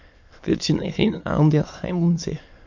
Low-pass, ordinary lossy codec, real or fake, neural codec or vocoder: 7.2 kHz; MP3, 48 kbps; fake; autoencoder, 22.05 kHz, a latent of 192 numbers a frame, VITS, trained on many speakers